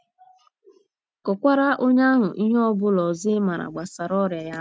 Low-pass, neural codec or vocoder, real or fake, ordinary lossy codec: none; none; real; none